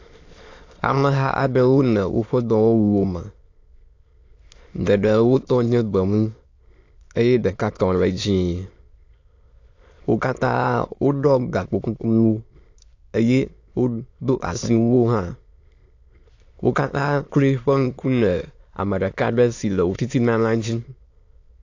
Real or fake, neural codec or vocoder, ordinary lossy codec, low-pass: fake; autoencoder, 22.05 kHz, a latent of 192 numbers a frame, VITS, trained on many speakers; AAC, 48 kbps; 7.2 kHz